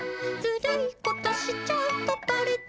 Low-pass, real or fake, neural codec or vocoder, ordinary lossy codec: none; real; none; none